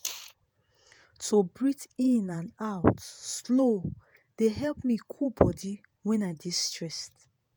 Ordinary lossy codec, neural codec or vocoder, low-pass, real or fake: none; vocoder, 48 kHz, 128 mel bands, Vocos; none; fake